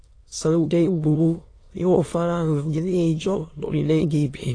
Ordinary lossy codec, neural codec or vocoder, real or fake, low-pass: AAC, 32 kbps; autoencoder, 22.05 kHz, a latent of 192 numbers a frame, VITS, trained on many speakers; fake; 9.9 kHz